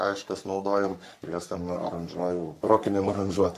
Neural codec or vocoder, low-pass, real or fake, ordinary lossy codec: codec, 44.1 kHz, 3.4 kbps, Pupu-Codec; 14.4 kHz; fake; AAC, 64 kbps